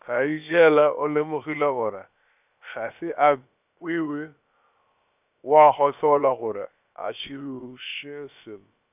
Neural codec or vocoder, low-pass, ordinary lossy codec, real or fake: codec, 16 kHz, about 1 kbps, DyCAST, with the encoder's durations; 3.6 kHz; none; fake